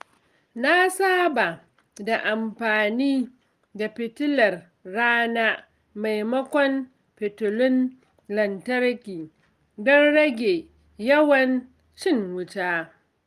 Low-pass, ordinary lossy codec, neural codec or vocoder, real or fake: 14.4 kHz; Opus, 32 kbps; none; real